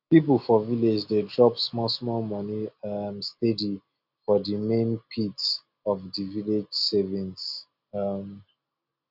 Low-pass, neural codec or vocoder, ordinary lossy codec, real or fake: 5.4 kHz; none; none; real